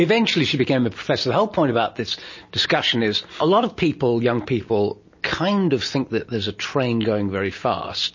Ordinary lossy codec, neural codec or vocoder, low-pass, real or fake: MP3, 32 kbps; none; 7.2 kHz; real